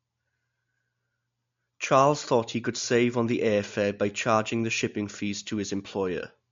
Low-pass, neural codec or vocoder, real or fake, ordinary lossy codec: 7.2 kHz; none; real; MP3, 48 kbps